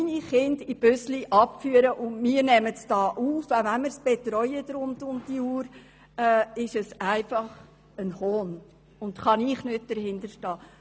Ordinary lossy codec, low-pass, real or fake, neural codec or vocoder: none; none; real; none